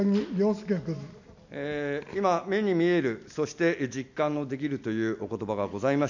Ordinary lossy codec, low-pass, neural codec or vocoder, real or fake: none; 7.2 kHz; none; real